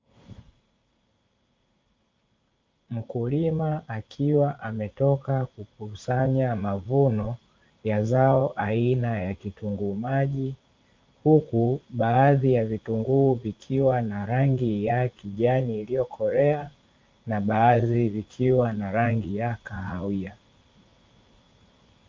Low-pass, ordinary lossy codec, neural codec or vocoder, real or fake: 7.2 kHz; Opus, 24 kbps; vocoder, 44.1 kHz, 80 mel bands, Vocos; fake